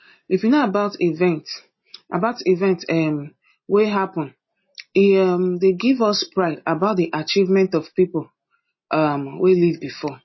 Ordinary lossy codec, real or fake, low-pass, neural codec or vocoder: MP3, 24 kbps; real; 7.2 kHz; none